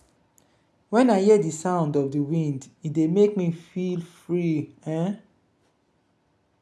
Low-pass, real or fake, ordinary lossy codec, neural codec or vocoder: none; real; none; none